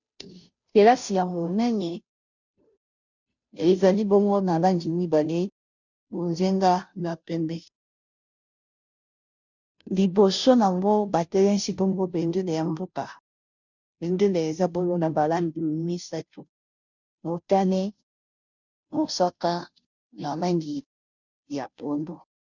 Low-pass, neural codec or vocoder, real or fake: 7.2 kHz; codec, 16 kHz, 0.5 kbps, FunCodec, trained on Chinese and English, 25 frames a second; fake